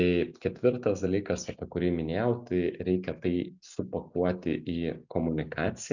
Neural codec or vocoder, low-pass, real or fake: none; 7.2 kHz; real